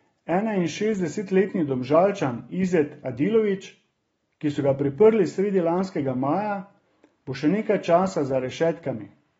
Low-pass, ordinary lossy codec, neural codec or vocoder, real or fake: 19.8 kHz; AAC, 24 kbps; none; real